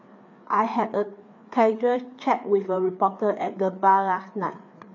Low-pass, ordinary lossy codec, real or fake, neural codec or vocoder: 7.2 kHz; MP3, 48 kbps; fake; codec, 16 kHz, 4 kbps, FreqCodec, larger model